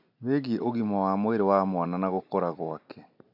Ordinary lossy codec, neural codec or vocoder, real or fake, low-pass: none; none; real; 5.4 kHz